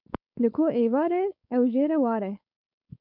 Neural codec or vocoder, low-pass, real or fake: codec, 16 kHz, 4.8 kbps, FACodec; 5.4 kHz; fake